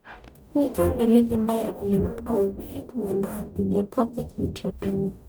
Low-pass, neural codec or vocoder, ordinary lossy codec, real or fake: none; codec, 44.1 kHz, 0.9 kbps, DAC; none; fake